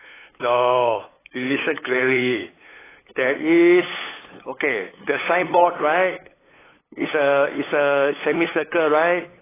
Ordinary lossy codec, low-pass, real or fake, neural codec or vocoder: AAC, 16 kbps; 3.6 kHz; fake; codec, 16 kHz, 8 kbps, FunCodec, trained on LibriTTS, 25 frames a second